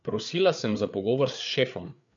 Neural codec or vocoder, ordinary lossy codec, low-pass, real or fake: codec, 16 kHz, 4 kbps, FreqCodec, larger model; MP3, 64 kbps; 7.2 kHz; fake